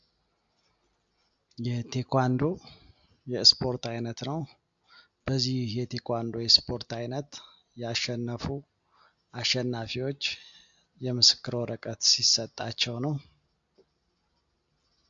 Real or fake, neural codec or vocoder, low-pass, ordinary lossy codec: real; none; 7.2 kHz; AAC, 64 kbps